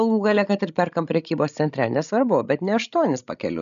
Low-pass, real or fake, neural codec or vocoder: 7.2 kHz; fake; codec, 16 kHz, 16 kbps, FreqCodec, larger model